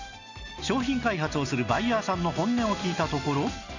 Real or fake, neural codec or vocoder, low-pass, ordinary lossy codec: real; none; 7.2 kHz; none